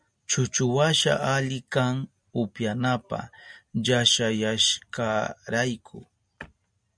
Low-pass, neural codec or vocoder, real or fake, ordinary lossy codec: 9.9 kHz; none; real; MP3, 96 kbps